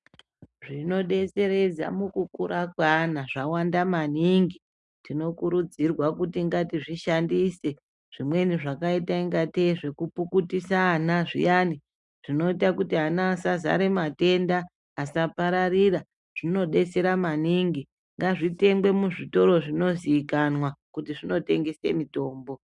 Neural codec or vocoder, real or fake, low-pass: none; real; 10.8 kHz